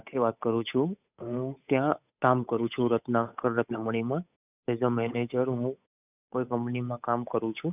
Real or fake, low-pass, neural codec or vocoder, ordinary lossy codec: fake; 3.6 kHz; codec, 16 kHz, 8 kbps, FunCodec, trained on Chinese and English, 25 frames a second; none